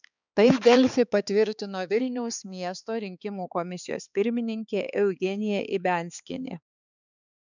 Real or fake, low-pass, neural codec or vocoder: fake; 7.2 kHz; codec, 16 kHz, 4 kbps, X-Codec, HuBERT features, trained on balanced general audio